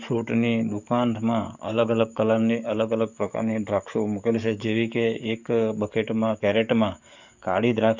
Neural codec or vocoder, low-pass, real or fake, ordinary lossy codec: codec, 44.1 kHz, 7.8 kbps, DAC; 7.2 kHz; fake; none